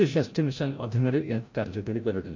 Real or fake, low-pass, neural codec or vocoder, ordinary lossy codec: fake; 7.2 kHz; codec, 16 kHz, 0.5 kbps, FreqCodec, larger model; MP3, 48 kbps